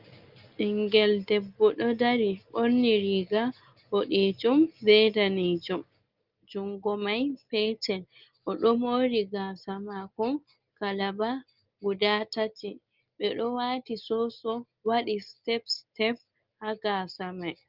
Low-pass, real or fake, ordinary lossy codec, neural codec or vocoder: 5.4 kHz; real; Opus, 24 kbps; none